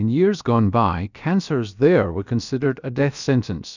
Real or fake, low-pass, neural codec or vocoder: fake; 7.2 kHz; codec, 16 kHz, about 1 kbps, DyCAST, with the encoder's durations